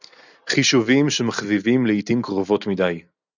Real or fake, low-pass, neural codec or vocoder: real; 7.2 kHz; none